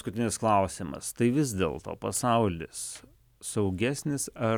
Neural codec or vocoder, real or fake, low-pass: none; real; 19.8 kHz